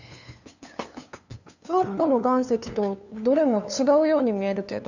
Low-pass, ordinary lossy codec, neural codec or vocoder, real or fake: 7.2 kHz; none; codec, 16 kHz, 2 kbps, FunCodec, trained on LibriTTS, 25 frames a second; fake